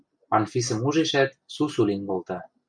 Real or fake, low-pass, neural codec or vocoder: real; 9.9 kHz; none